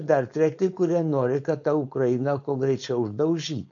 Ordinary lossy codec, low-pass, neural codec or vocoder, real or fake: AAC, 48 kbps; 7.2 kHz; codec, 16 kHz, 4.8 kbps, FACodec; fake